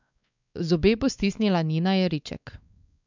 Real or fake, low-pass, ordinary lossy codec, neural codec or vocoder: fake; 7.2 kHz; none; codec, 16 kHz, 4 kbps, X-Codec, WavLM features, trained on Multilingual LibriSpeech